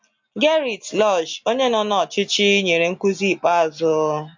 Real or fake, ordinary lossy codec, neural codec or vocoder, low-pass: real; MP3, 48 kbps; none; 7.2 kHz